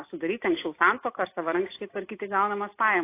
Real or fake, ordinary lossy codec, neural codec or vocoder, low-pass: real; AAC, 24 kbps; none; 3.6 kHz